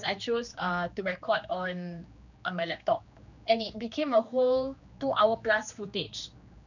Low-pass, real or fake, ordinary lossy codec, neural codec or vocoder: 7.2 kHz; fake; none; codec, 16 kHz, 2 kbps, X-Codec, HuBERT features, trained on general audio